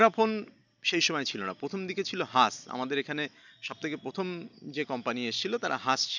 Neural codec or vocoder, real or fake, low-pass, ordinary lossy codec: none; real; 7.2 kHz; none